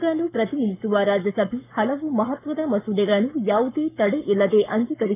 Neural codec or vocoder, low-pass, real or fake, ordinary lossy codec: codec, 44.1 kHz, 7.8 kbps, Pupu-Codec; 3.6 kHz; fake; none